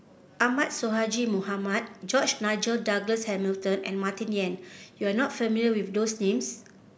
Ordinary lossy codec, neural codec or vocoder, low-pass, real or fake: none; none; none; real